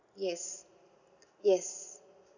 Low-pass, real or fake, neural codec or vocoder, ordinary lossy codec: 7.2 kHz; real; none; none